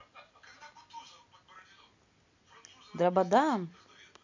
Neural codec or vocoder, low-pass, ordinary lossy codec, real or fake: none; 7.2 kHz; MP3, 64 kbps; real